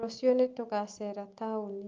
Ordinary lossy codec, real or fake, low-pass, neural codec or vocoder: Opus, 24 kbps; real; 7.2 kHz; none